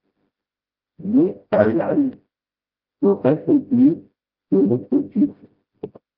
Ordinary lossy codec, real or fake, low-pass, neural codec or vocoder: Opus, 32 kbps; fake; 5.4 kHz; codec, 16 kHz, 0.5 kbps, FreqCodec, smaller model